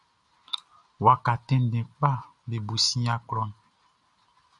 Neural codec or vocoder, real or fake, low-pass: none; real; 10.8 kHz